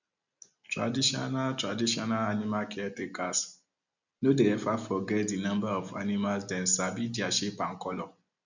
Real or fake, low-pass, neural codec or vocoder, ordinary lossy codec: real; 7.2 kHz; none; none